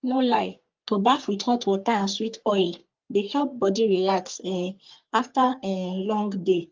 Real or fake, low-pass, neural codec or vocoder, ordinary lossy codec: fake; 7.2 kHz; codec, 44.1 kHz, 3.4 kbps, Pupu-Codec; Opus, 24 kbps